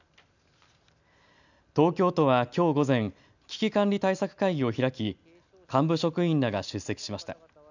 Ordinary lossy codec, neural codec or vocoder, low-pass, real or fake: none; none; 7.2 kHz; real